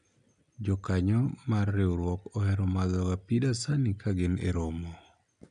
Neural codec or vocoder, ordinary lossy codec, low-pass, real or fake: none; MP3, 96 kbps; 9.9 kHz; real